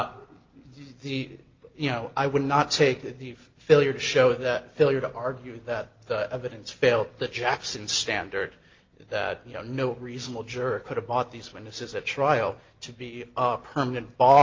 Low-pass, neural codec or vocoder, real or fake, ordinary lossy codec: 7.2 kHz; none; real; Opus, 24 kbps